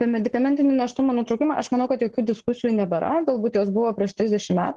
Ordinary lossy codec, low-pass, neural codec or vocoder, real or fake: Opus, 16 kbps; 10.8 kHz; codec, 44.1 kHz, 7.8 kbps, Pupu-Codec; fake